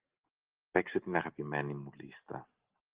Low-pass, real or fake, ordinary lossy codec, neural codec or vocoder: 3.6 kHz; real; Opus, 16 kbps; none